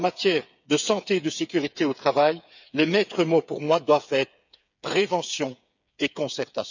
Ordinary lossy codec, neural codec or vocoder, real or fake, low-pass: none; codec, 16 kHz, 8 kbps, FreqCodec, smaller model; fake; 7.2 kHz